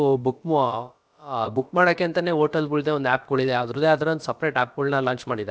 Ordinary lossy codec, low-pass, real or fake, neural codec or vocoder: none; none; fake; codec, 16 kHz, about 1 kbps, DyCAST, with the encoder's durations